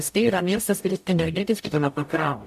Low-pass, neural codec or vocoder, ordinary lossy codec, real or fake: 14.4 kHz; codec, 44.1 kHz, 0.9 kbps, DAC; AAC, 96 kbps; fake